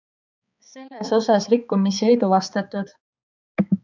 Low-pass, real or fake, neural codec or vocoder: 7.2 kHz; fake; codec, 16 kHz, 4 kbps, X-Codec, HuBERT features, trained on balanced general audio